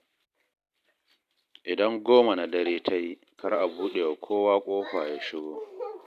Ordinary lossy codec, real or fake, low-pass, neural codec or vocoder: none; real; 14.4 kHz; none